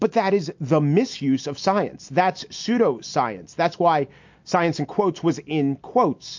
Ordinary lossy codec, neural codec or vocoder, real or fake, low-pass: MP3, 48 kbps; none; real; 7.2 kHz